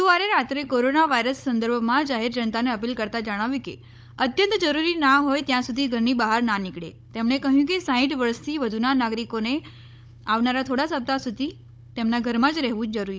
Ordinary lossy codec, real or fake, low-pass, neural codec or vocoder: none; fake; none; codec, 16 kHz, 16 kbps, FunCodec, trained on Chinese and English, 50 frames a second